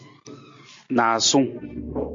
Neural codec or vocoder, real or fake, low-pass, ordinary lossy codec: none; real; 7.2 kHz; MP3, 48 kbps